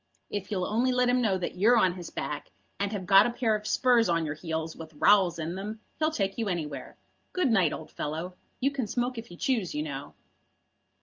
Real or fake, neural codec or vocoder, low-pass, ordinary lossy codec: real; none; 7.2 kHz; Opus, 32 kbps